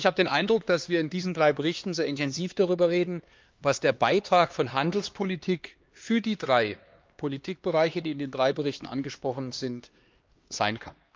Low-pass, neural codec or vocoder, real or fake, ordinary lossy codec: 7.2 kHz; codec, 16 kHz, 2 kbps, X-Codec, HuBERT features, trained on LibriSpeech; fake; Opus, 24 kbps